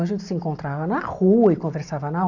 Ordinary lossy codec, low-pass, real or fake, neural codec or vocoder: none; 7.2 kHz; real; none